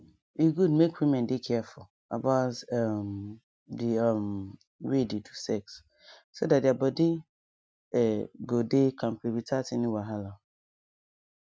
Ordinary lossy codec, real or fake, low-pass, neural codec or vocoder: none; real; none; none